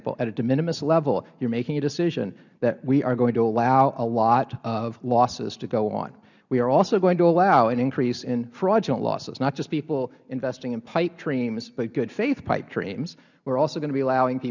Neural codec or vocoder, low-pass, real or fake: none; 7.2 kHz; real